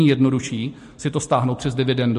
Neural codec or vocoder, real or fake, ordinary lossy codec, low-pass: none; real; MP3, 48 kbps; 14.4 kHz